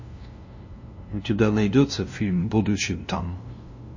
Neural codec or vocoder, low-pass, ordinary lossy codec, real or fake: codec, 16 kHz, 0.5 kbps, FunCodec, trained on LibriTTS, 25 frames a second; 7.2 kHz; MP3, 32 kbps; fake